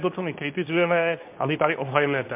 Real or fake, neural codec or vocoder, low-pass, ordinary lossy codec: fake; codec, 24 kHz, 0.9 kbps, WavTokenizer, medium speech release version 2; 3.6 kHz; MP3, 32 kbps